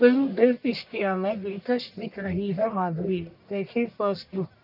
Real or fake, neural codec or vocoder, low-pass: fake; codec, 44.1 kHz, 1.7 kbps, Pupu-Codec; 5.4 kHz